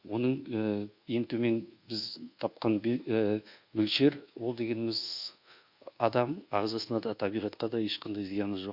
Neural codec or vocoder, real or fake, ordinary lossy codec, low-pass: codec, 24 kHz, 1.2 kbps, DualCodec; fake; Opus, 64 kbps; 5.4 kHz